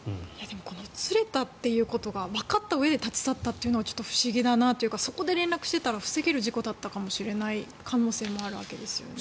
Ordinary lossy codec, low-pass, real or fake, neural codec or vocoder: none; none; real; none